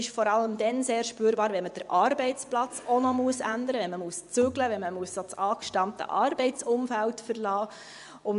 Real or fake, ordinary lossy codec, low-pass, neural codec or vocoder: fake; none; 10.8 kHz; vocoder, 24 kHz, 100 mel bands, Vocos